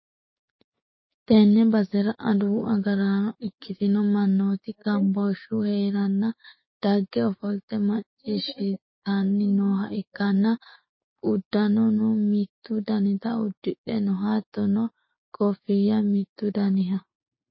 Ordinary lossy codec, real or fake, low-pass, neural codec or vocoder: MP3, 24 kbps; fake; 7.2 kHz; codec, 16 kHz, 6 kbps, DAC